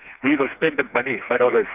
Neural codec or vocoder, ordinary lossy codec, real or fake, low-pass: codec, 16 kHz, 2 kbps, FreqCodec, smaller model; none; fake; 3.6 kHz